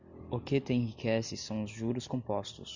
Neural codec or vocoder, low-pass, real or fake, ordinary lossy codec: none; 7.2 kHz; real; Opus, 64 kbps